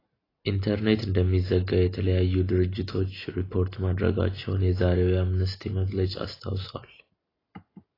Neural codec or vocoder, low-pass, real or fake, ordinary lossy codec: none; 5.4 kHz; real; AAC, 24 kbps